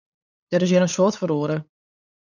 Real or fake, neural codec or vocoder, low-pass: fake; codec, 16 kHz, 8 kbps, FunCodec, trained on LibriTTS, 25 frames a second; 7.2 kHz